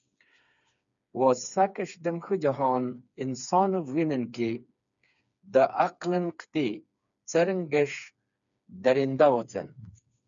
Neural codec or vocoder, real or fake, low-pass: codec, 16 kHz, 4 kbps, FreqCodec, smaller model; fake; 7.2 kHz